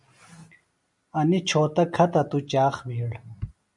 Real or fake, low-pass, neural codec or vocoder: real; 10.8 kHz; none